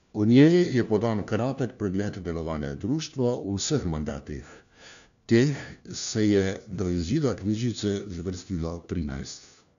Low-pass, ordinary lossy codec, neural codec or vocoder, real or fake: 7.2 kHz; none; codec, 16 kHz, 1 kbps, FunCodec, trained on LibriTTS, 50 frames a second; fake